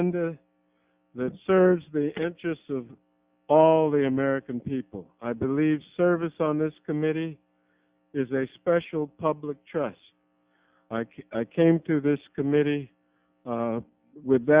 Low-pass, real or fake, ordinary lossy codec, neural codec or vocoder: 3.6 kHz; real; Opus, 64 kbps; none